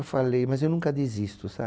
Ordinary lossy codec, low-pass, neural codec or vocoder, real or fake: none; none; none; real